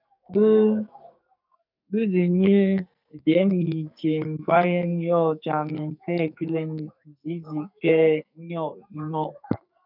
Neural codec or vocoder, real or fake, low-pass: codec, 44.1 kHz, 2.6 kbps, SNAC; fake; 5.4 kHz